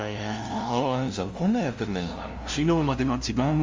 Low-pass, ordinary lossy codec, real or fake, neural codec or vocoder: 7.2 kHz; Opus, 32 kbps; fake; codec, 16 kHz, 0.5 kbps, FunCodec, trained on LibriTTS, 25 frames a second